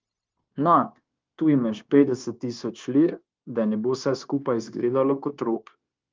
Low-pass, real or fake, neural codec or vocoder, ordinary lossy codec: 7.2 kHz; fake; codec, 16 kHz, 0.9 kbps, LongCat-Audio-Codec; Opus, 32 kbps